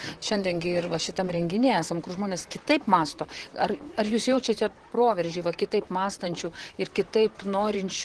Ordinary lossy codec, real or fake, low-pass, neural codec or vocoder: Opus, 16 kbps; fake; 10.8 kHz; vocoder, 44.1 kHz, 128 mel bands, Pupu-Vocoder